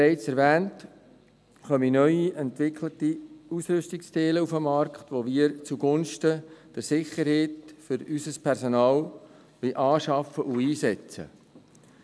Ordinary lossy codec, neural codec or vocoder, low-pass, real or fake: none; none; none; real